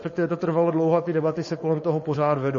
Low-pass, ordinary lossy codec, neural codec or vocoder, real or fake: 7.2 kHz; MP3, 32 kbps; codec, 16 kHz, 4.8 kbps, FACodec; fake